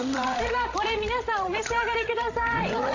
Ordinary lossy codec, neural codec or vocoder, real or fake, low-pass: AAC, 48 kbps; codec, 16 kHz, 16 kbps, FreqCodec, larger model; fake; 7.2 kHz